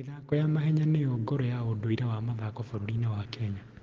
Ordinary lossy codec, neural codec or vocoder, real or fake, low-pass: Opus, 16 kbps; none; real; 7.2 kHz